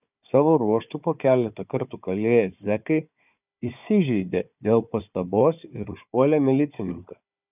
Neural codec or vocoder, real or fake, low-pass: codec, 16 kHz, 4 kbps, FunCodec, trained on Chinese and English, 50 frames a second; fake; 3.6 kHz